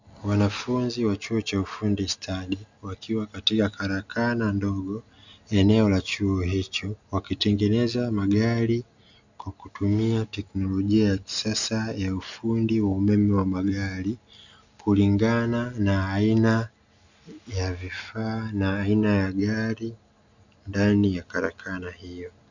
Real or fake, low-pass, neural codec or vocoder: real; 7.2 kHz; none